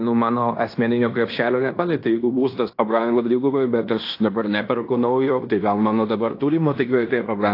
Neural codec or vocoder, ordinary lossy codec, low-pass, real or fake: codec, 16 kHz in and 24 kHz out, 0.9 kbps, LongCat-Audio-Codec, fine tuned four codebook decoder; AAC, 32 kbps; 5.4 kHz; fake